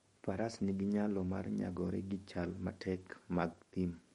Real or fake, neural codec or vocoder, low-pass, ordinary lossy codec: fake; codec, 44.1 kHz, 7.8 kbps, DAC; 19.8 kHz; MP3, 48 kbps